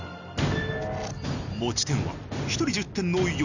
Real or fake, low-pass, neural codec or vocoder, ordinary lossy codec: real; 7.2 kHz; none; none